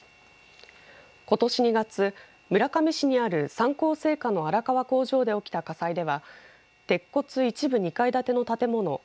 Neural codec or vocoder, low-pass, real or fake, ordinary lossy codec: none; none; real; none